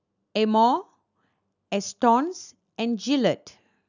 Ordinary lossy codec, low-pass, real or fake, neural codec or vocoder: none; 7.2 kHz; real; none